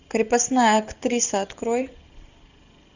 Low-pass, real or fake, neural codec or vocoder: 7.2 kHz; real; none